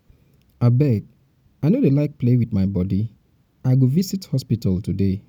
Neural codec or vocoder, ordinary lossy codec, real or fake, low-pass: none; none; real; 19.8 kHz